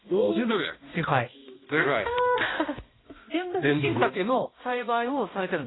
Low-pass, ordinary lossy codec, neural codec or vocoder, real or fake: 7.2 kHz; AAC, 16 kbps; codec, 16 kHz, 1 kbps, X-Codec, HuBERT features, trained on balanced general audio; fake